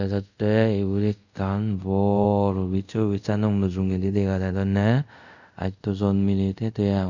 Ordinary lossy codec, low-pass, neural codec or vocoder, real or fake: none; 7.2 kHz; codec, 24 kHz, 0.5 kbps, DualCodec; fake